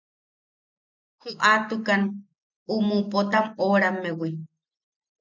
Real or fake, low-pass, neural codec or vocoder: real; 7.2 kHz; none